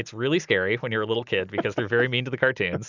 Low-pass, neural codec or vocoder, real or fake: 7.2 kHz; none; real